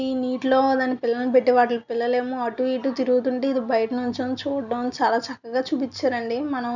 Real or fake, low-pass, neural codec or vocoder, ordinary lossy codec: real; 7.2 kHz; none; none